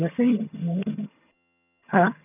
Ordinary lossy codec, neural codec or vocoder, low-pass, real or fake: none; vocoder, 22.05 kHz, 80 mel bands, HiFi-GAN; 3.6 kHz; fake